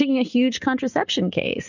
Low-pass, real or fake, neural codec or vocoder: 7.2 kHz; real; none